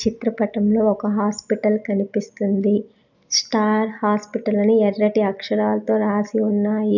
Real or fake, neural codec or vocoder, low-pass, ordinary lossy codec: real; none; 7.2 kHz; none